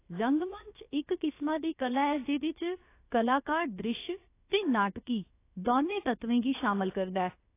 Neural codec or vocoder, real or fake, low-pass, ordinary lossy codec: codec, 16 kHz, about 1 kbps, DyCAST, with the encoder's durations; fake; 3.6 kHz; AAC, 24 kbps